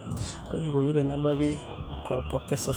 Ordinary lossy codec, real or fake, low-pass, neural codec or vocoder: none; fake; none; codec, 44.1 kHz, 2.6 kbps, DAC